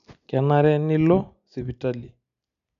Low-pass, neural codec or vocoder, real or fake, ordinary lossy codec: 7.2 kHz; none; real; none